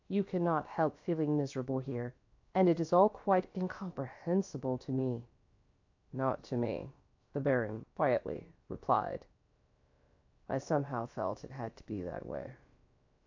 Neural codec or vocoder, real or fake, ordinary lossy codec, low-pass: codec, 16 kHz, about 1 kbps, DyCAST, with the encoder's durations; fake; AAC, 48 kbps; 7.2 kHz